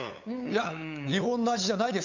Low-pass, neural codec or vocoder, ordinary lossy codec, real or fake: 7.2 kHz; codec, 16 kHz, 8 kbps, FunCodec, trained on LibriTTS, 25 frames a second; none; fake